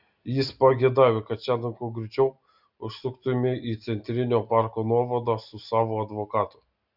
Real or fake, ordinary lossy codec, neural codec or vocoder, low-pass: real; Opus, 64 kbps; none; 5.4 kHz